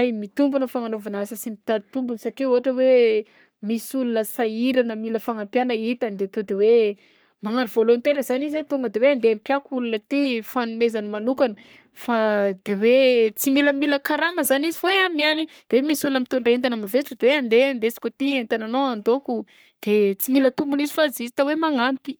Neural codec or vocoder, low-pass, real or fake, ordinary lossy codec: codec, 44.1 kHz, 3.4 kbps, Pupu-Codec; none; fake; none